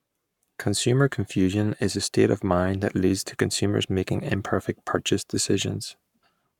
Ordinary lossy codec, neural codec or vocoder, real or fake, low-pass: none; codec, 44.1 kHz, 7.8 kbps, Pupu-Codec; fake; 19.8 kHz